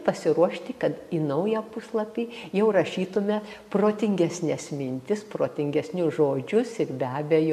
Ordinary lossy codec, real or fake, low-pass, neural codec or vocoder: MP3, 96 kbps; real; 14.4 kHz; none